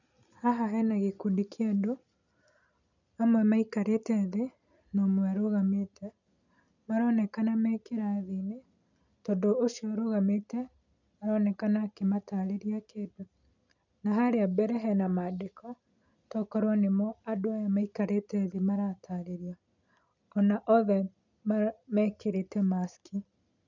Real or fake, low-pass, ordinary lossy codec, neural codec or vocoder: real; 7.2 kHz; none; none